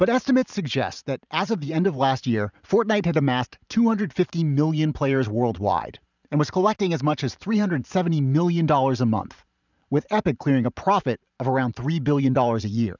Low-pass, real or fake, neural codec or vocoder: 7.2 kHz; fake; vocoder, 44.1 kHz, 128 mel bands every 512 samples, BigVGAN v2